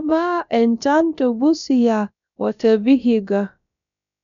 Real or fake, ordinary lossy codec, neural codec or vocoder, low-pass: fake; none; codec, 16 kHz, about 1 kbps, DyCAST, with the encoder's durations; 7.2 kHz